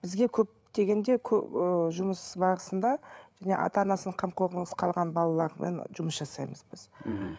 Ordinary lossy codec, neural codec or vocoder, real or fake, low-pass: none; codec, 16 kHz, 8 kbps, FreqCodec, larger model; fake; none